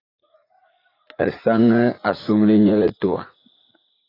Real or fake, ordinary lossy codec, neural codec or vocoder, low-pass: fake; AAC, 24 kbps; vocoder, 44.1 kHz, 80 mel bands, Vocos; 5.4 kHz